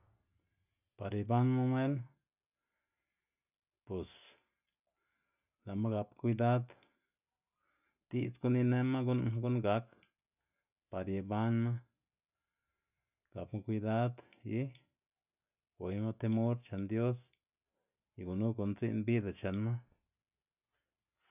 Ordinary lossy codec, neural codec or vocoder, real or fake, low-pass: none; none; real; 3.6 kHz